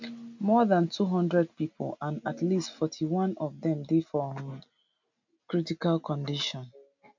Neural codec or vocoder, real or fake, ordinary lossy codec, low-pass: none; real; MP3, 64 kbps; 7.2 kHz